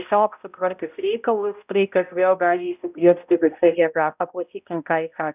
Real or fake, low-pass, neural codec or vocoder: fake; 3.6 kHz; codec, 16 kHz, 0.5 kbps, X-Codec, HuBERT features, trained on balanced general audio